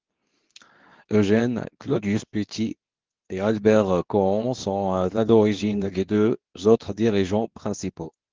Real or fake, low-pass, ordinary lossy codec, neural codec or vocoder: fake; 7.2 kHz; Opus, 16 kbps; codec, 24 kHz, 0.9 kbps, WavTokenizer, medium speech release version 2